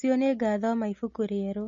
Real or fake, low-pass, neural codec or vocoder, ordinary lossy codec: real; 7.2 kHz; none; MP3, 32 kbps